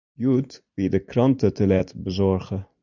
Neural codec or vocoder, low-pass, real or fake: vocoder, 44.1 kHz, 128 mel bands every 256 samples, BigVGAN v2; 7.2 kHz; fake